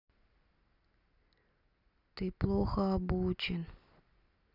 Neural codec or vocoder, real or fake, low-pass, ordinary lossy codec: none; real; 5.4 kHz; none